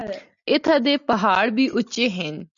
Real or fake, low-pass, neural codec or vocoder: real; 7.2 kHz; none